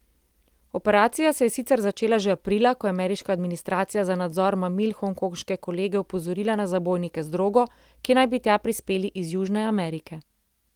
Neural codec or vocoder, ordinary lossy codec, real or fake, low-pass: none; Opus, 24 kbps; real; 19.8 kHz